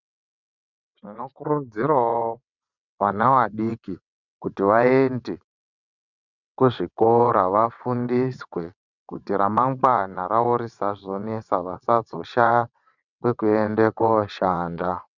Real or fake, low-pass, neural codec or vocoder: fake; 7.2 kHz; vocoder, 22.05 kHz, 80 mel bands, WaveNeXt